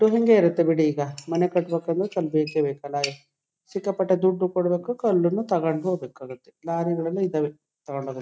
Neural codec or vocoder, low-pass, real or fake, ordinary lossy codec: none; none; real; none